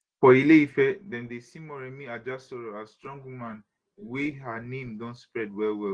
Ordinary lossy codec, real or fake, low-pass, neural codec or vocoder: Opus, 16 kbps; real; 9.9 kHz; none